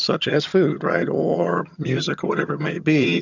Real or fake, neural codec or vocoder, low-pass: fake; vocoder, 22.05 kHz, 80 mel bands, HiFi-GAN; 7.2 kHz